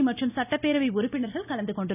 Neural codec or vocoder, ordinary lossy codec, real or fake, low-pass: none; none; real; 3.6 kHz